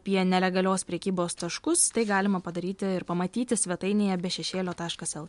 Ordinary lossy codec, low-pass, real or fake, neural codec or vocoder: MP3, 64 kbps; 10.8 kHz; real; none